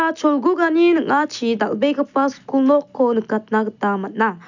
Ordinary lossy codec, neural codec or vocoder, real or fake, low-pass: none; none; real; 7.2 kHz